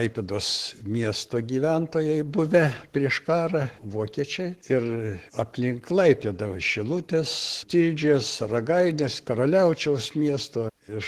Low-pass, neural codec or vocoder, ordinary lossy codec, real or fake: 14.4 kHz; codec, 44.1 kHz, 7.8 kbps, Pupu-Codec; Opus, 16 kbps; fake